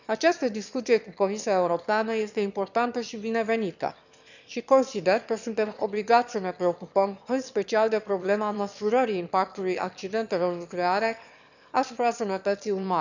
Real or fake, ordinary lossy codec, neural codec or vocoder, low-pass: fake; none; autoencoder, 22.05 kHz, a latent of 192 numbers a frame, VITS, trained on one speaker; 7.2 kHz